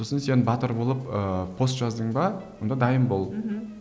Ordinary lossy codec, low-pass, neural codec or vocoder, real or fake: none; none; none; real